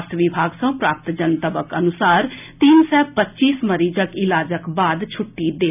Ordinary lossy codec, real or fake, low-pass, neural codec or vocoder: none; real; 3.6 kHz; none